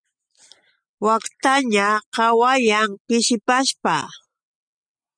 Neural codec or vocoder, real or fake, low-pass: none; real; 9.9 kHz